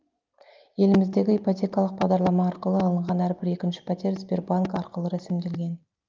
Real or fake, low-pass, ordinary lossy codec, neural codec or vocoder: real; 7.2 kHz; Opus, 32 kbps; none